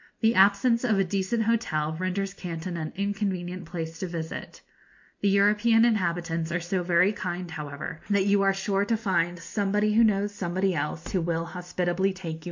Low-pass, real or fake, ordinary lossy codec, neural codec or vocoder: 7.2 kHz; real; MP3, 48 kbps; none